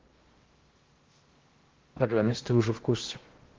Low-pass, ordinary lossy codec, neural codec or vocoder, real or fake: 7.2 kHz; Opus, 16 kbps; codec, 16 kHz in and 24 kHz out, 0.6 kbps, FocalCodec, streaming, 4096 codes; fake